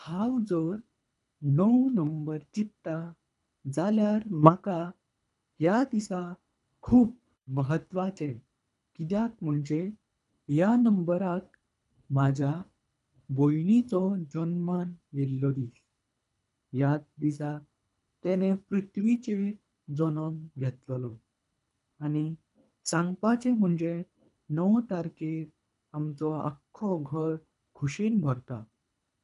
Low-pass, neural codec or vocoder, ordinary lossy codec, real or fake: 10.8 kHz; codec, 24 kHz, 3 kbps, HILCodec; none; fake